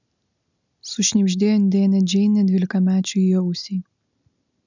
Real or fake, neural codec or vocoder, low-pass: real; none; 7.2 kHz